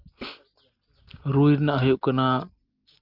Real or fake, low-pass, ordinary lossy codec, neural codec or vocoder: real; 5.4 kHz; Opus, 24 kbps; none